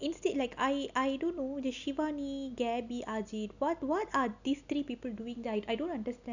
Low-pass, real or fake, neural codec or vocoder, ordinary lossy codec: 7.2 kHz; real; none; none